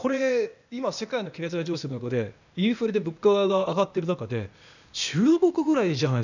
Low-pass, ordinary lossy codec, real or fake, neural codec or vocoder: 7.2 kHz; none; fake; codec, 16 kHz, 0.8 kbps, ZipCodec